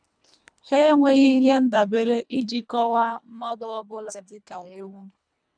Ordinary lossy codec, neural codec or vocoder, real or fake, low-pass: none; codec, 24 kHz, 1.5 kbps, HILCodec; fake; 9.9 kHz